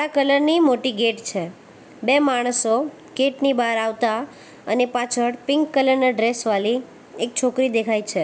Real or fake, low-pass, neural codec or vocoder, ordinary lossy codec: real; none; none; none